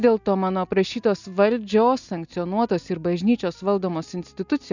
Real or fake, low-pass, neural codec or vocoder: real; 7.2 kHz; none